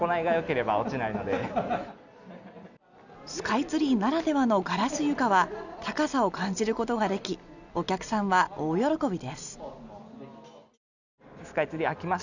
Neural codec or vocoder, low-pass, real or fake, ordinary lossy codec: none; 7.2 kHz; real; none